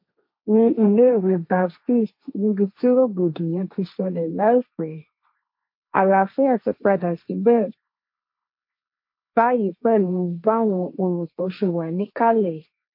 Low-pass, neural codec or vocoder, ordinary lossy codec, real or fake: 5.4 kHz; codec, 16 kHz, 1.1 kbps, Voila-Tokenizer; MP3, 32 kbps; fake